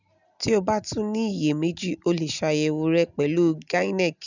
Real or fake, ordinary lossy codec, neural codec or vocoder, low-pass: real; none; none; 7.2 kHz